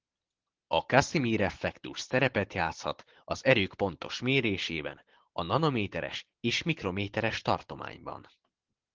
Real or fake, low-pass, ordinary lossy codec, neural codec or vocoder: real; 7.2 kHz; Opus, 16 kbps; none